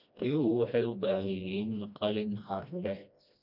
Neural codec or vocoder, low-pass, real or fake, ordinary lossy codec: codec, 16 kHz, 1 kbps, FreqCodec, smaller model; 5.4 kHz; fake; none